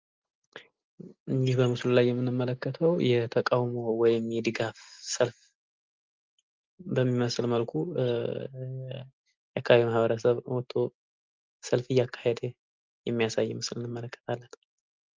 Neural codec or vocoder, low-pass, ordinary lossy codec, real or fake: none; 7.2 kHz; Opus, 32 kbps; real